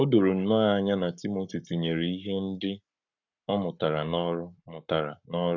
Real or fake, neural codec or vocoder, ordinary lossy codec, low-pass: fake; codec, 44.1 kHz, 7.8 kbps, Pupu-Codec; none; 7.2 kHz